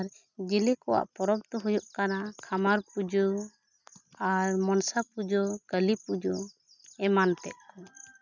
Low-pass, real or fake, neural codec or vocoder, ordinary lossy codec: 7.2 kHz; real; none; none